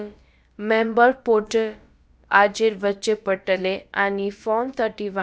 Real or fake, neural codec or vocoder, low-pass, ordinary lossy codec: fake; codec, 16 kHz, about 1 kbps, DyCAST, with the encoder's durations; none; none